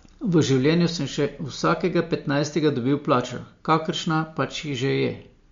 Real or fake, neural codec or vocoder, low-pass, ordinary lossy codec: real; none; 7.2 kHz; MP3, 48 kbps